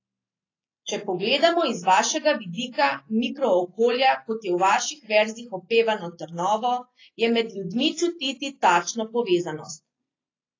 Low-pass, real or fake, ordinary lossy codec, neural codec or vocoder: 7.2 kHz; real; AAC, 32 kbps; none